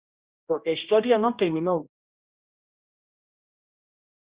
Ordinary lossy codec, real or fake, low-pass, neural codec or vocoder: Opus, 64 kbps; fake; 3.6 kHz; codec, 16 kHz, 1 kbps, X-Codec, HuBERT features, trained on general audio